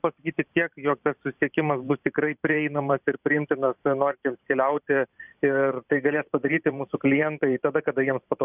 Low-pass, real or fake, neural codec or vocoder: 3.6 kHz; fake; vocoder, 44.1 kHz, 128 mel bands every 512 samples, BigVGAN v2